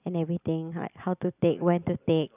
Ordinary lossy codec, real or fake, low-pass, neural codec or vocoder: none; real; 3.6 kHz; none